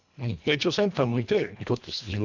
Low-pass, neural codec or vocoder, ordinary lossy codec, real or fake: 7.2 kHz; codec, 24 kHz, 1.5 kbps, HILCodec; none; fake